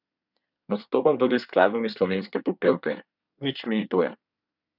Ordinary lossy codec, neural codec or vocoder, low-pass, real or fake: none; codec, 24 kHz, 1 kbps, SNAC; 5.4 kHz; fake